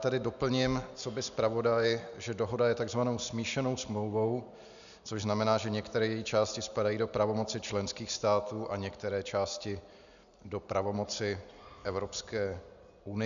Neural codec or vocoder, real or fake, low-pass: none; real; 7.2 kHz